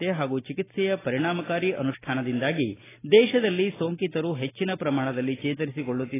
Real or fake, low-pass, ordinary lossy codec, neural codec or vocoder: real; 3.6 kHz; AAC, 16 kbps; none